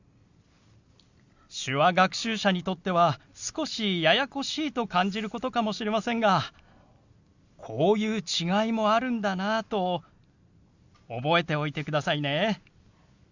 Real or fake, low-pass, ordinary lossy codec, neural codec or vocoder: real; 7.2 kHz; Opus, 64 kbps; none